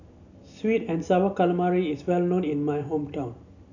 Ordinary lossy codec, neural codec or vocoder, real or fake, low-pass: none; none; real; 7.2 kHz